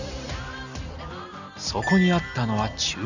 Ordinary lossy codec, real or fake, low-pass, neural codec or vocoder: none; real; 7.2 kHz; none